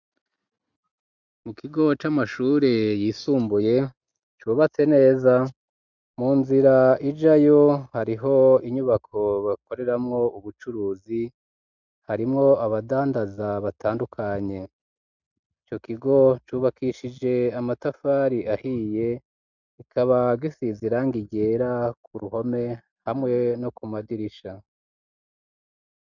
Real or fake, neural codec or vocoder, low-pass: real; none; 7.2 kHz